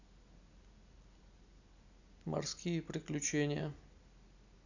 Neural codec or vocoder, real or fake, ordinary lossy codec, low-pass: none; real; none; 7.2 kHz